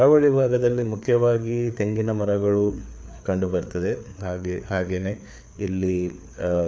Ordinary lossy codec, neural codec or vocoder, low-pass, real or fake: none; codec, 16 kHz, 4 kbps, FreqCodec, larger model; none; fake